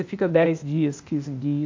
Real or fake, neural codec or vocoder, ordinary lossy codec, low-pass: fake; codec, 16 kHz, 0.8 kbps, ZipCodec; MP3, 64 kbps; 7.2 kHz